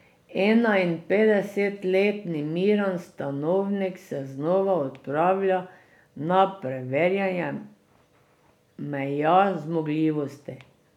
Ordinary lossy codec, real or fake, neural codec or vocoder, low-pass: none; real; none; 19.8 kHz